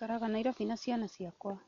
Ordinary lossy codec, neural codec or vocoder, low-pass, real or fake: none; none; 7.2 kHz; real